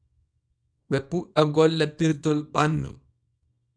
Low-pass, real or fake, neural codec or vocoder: 9.9 kHz; fake; codec, 24 kHz, 0.9 kbps, WavTokenizer, small release